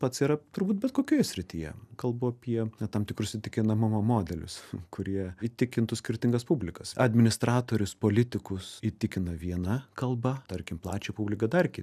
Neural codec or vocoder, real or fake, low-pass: none; real; 14.4 kHz